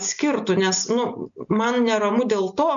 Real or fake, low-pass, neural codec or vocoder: real; 7.2 kHz; none